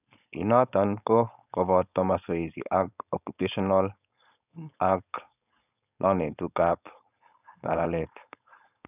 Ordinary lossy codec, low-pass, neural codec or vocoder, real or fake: none; 3.6 kHz; codec, 16 kHz, 4.8 kbps, FACodec; fake